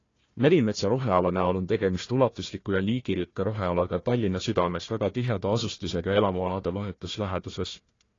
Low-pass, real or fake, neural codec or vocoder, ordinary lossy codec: 7.2 kHz; fake; codec, 16 kHz, 1 kbps, FunCodec, trained on Chinese and English, 50 frames a second; AAC, 32 kbps